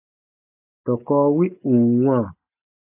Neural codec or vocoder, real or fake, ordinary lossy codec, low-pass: none; real; AAC, 32 kbps; 3.6 kHz